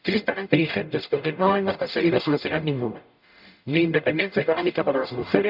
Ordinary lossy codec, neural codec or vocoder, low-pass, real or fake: none; codec, 44.1 kHz, 0.9 kbps, DAC; 5.4 kHz; fake